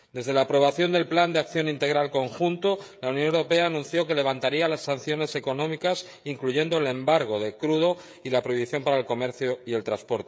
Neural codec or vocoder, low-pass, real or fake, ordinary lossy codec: codec, 16 kHz, 16 kbps, FreqCodec, smaller model; none; fake; none